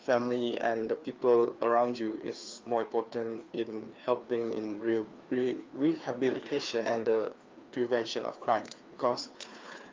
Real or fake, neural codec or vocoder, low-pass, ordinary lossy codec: fake; codec, 16 kHz, 2 kbps, FreqCodec, larger model; 7.2 kHz; Opus, 24 kbps